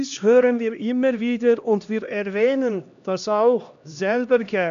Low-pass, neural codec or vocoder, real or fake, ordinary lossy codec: 7.2 kHz; codec, 16 kHz, 1 kbps, X-Codec, HuBERT features, trained on LibriSpeech; fake; none